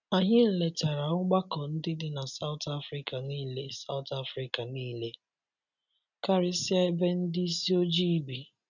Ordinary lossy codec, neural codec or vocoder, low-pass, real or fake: none; none; 7.2 kHz; real